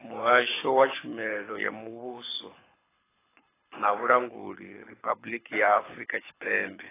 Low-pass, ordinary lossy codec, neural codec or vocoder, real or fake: 3.6 kHz; AAC, 16 kbps; codec, 24 kHz, 6 kbps, HILCodec; fake